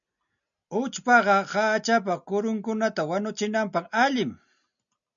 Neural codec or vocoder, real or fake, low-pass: none; real; 7.2 kHz